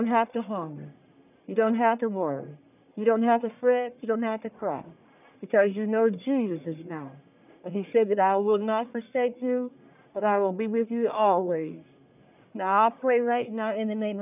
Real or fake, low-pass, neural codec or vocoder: fake; 3.6 kHz; codec, 44.1 kHz, 1.7 kbps, Pupu-Codec